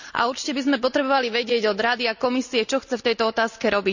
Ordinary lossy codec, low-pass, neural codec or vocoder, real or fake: none; 7.2 kHz; none; real